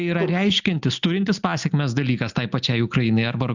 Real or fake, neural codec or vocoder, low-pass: real; none; 7.2 kHz